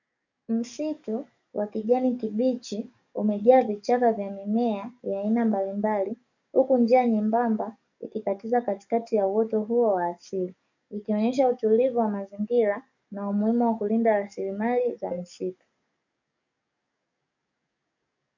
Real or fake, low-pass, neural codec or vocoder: fake; 7.2 kHz; codec, 44.1 kHz, 7.8 kbps, DAC